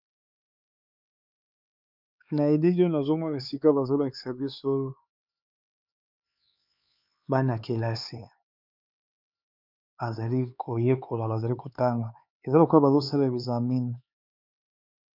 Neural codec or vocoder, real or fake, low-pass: codec, 16 kHz, 4 kbps, X-Codec, HuBERT features, trained on LibriSpeech; fake; 5.4 kHz